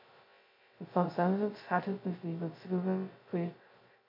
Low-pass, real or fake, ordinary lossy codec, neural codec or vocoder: 5.4 kHz; fake; MP3, 32 kbps; codec, 16 kHz, 0.2 kbps, FocalCodec